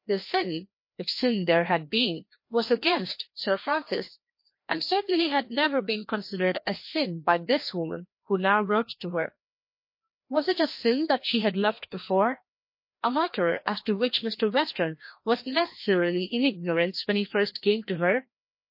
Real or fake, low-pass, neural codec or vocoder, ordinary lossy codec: fake; 5.4 kHz; codec, 16 kHz, 1 kbps, FreqCodec, larger model; MP3, 32 kbps